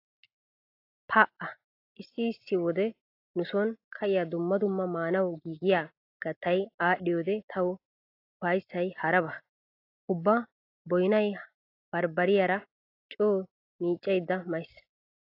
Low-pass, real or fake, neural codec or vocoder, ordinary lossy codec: 5.4 kHz; real; none; AAC, 32 kbps